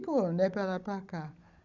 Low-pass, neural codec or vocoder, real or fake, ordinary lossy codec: 7.2 kHz; codec, 16 kHz, 16 kbps, FunCodec, trained on Chinese and English, 50 frames a second; fake; Opus, 64 kbps